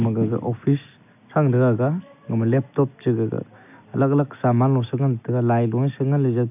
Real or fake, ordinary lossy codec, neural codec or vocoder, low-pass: real; none; none; 3.6 kHz